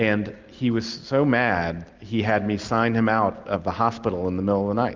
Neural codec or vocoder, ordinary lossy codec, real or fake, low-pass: none; Opus, 32 kbps; real; 7.2 kHz